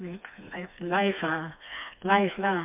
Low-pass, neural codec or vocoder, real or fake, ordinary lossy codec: 3.6 kHz; codec, 16 kHz, 2 kbps, FreqCodec, smaller model; fake; MP3, 32 kbps